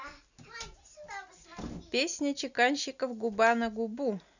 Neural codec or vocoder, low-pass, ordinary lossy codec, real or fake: none; 7.2 kHz; none; real